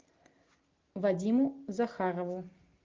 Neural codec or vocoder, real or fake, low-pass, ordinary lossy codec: none; real; 7.2 kHz; Opus, 32 kbps